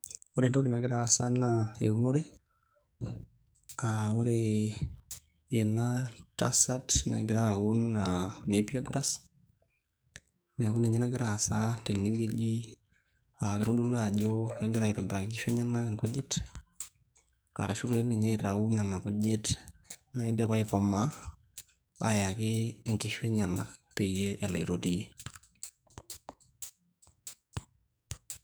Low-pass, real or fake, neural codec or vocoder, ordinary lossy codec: none; fake; codec, 44.1 kHz, 2.6 kbps, SNAC; none